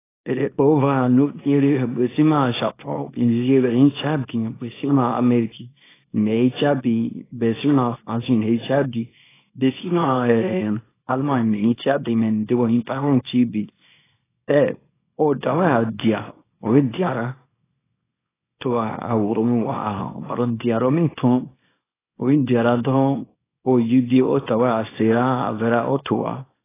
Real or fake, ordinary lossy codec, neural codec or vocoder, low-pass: fake; AAC, 16 kbps; codec, 24 kHz, 0.9 kbps, WavTokenizer, small release; 3.6 kHz